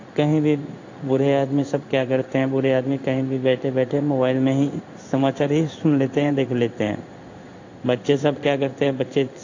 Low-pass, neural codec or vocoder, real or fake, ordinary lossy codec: 7.2 kHz; codec, 16 kHz in and 24 kHz out, 1 kbps, XY-Tokenizer; fake; AAC, 48 kbps